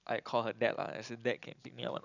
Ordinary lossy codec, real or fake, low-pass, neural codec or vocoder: none; real; 7.2 kHz; none